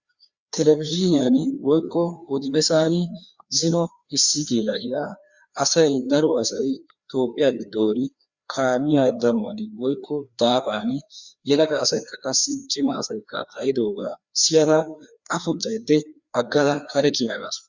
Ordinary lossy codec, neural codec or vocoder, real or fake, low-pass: Opus, 64 kbps; codec, 16 kHz, 2 kbps, FreqCodec, larger model; fake; 7.2 kHz